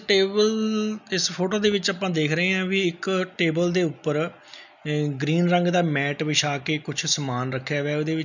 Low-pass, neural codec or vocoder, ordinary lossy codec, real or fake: 7.2 kHz; none; none; real